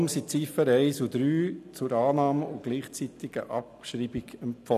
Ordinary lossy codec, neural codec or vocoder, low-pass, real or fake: none; none; 14.4 kHz; real